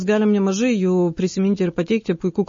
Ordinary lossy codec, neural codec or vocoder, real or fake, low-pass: MP3, 32 kbps; none; real; 7.2 kHz